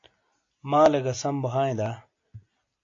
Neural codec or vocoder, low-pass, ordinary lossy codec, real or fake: none; 7.2 kHz; AAC, 48 kbps; real